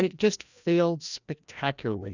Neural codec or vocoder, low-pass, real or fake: codec, 16 kHz, 1 kbps, FreqCodec, larger model; 7.2 kHz; fake